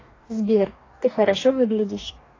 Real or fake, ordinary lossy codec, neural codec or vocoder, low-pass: fake; AAC, 32 kbps; codec, 44.1 kHz, 2.6 kbps, DAC; 7.2 kHz